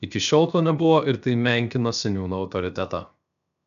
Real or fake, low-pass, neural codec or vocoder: fake; 7.2 kHz; codec, 16 kHz, 0.7 kbps, FocalCodec